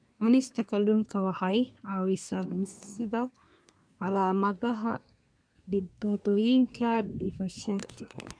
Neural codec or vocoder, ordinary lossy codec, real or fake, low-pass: codec, 24 kHz, 1 kbps, SNAC; none; fake; 9.9 kHz